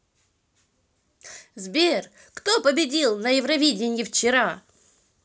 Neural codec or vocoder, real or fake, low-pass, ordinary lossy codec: none; real; none; none